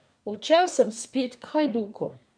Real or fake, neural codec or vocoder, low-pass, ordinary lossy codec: fake; codec, 24 kHz, 1 kbps, SNAC; 9.9 kHz; none